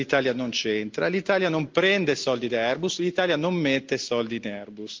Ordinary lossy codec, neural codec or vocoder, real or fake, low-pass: Opus, 32 kbps; none; real; 7.2 kHz